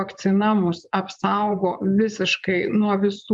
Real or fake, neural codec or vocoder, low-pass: fake; vocoder, 24 kHz, 100 mel bands, Vocos; 10.8 kHz